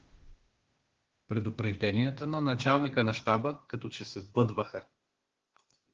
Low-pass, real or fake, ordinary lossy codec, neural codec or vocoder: 7.2 kHz; fake; Opus, 16 kbps; codec, 16 kHz, 0.8 kbps, ZipCodec